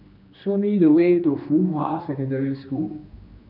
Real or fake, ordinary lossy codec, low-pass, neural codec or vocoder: fake; none; 5.4 kHz; codec, 16 kHz, 2 kbps, X-Codec, HuBERT features, trained on general audio